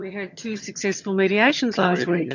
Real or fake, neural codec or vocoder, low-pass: fake; vocoder, 22.05 kHz, 80 mel bands, HiFi-GAN; 7.2 kHz